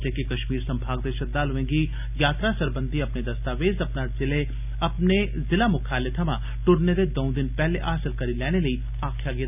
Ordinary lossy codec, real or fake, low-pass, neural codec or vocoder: none; real; 3.6 kHz; none